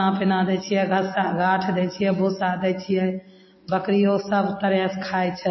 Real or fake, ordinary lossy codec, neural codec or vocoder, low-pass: real; MP3, 24 kbps; none; 7.2 kHz